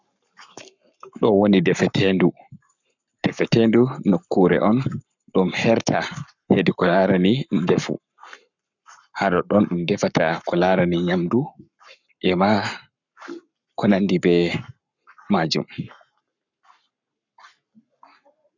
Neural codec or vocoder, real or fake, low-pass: codec, 44.1 kHz, 7.8 kbps, Pupu-Codec; fake; 7.2 kHz